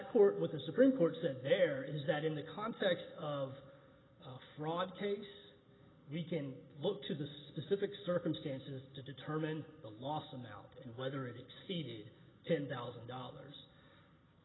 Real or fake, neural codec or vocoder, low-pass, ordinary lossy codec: real; none; 7.2 kHz; AAC, 16 kbps